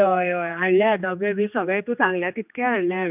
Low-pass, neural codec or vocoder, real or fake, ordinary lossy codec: 3.6 kHz; codec, 16 kHz, 2 kbps, X-Codec, HuBERT features, trained on general audio; fake; none